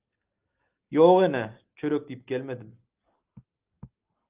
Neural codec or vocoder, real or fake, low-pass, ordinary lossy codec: none; real; 3.6 kHz; Opus, 24 kbps